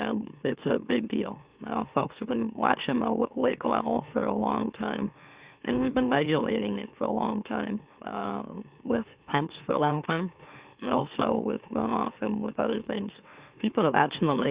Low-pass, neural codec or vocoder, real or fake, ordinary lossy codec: 3.6 kHz; autoencoder, 44.1 kHz, a latent of 192 numbers a frame, MeloTTS; fake; Opus, 64 kbps